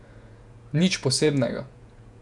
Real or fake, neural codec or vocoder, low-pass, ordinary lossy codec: fake; vocoder, 48 kHz, 128 mel bands, Vocos; 10.8 kHz; none